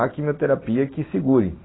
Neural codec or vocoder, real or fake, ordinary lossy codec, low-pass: none; real; AAC, 16 kbps; 7.2 kHz